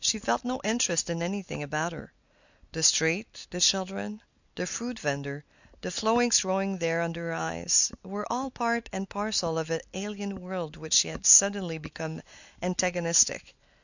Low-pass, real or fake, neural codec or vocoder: 7.2 kHz; real; none